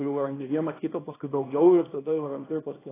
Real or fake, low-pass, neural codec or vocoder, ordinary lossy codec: fake; 3.6 kHz; codec, 24 kHz, 0.9 kbps, WavTokenizer, small release; AAC, 16 kbps